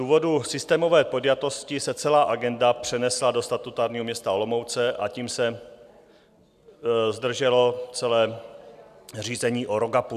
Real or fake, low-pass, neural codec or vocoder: real; 14.4 kHz; none